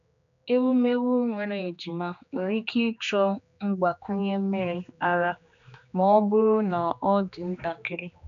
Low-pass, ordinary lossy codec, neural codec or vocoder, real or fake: 7.2 kHz; none; codec, 16 kHz, 2 kbps, X-Codec, HuBERT features, trained on general audio; fake